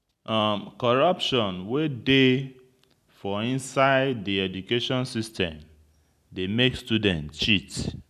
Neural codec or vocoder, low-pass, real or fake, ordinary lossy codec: none; 14.4 kHz; real; none